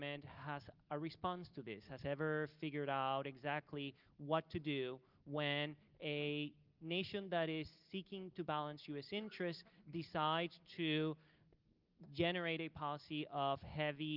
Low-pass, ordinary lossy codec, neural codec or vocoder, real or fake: 5.4 kHz; Opus, 24 kbps; none; real